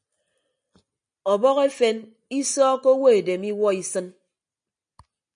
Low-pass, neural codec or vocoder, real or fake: 9.9 kHz; none; real